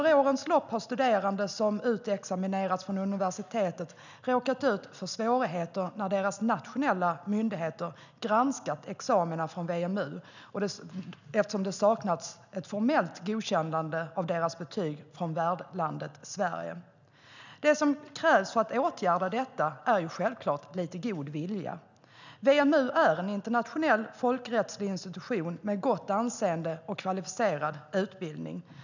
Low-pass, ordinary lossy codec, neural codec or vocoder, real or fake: 7.2 kHz; none; none; real